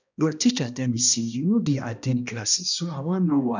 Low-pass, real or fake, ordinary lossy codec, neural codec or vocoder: 7.2 kHz; fake; none; codec, 16 kHz, 1 kbps, X-Codec, HuBERT features, trained on balanced general audio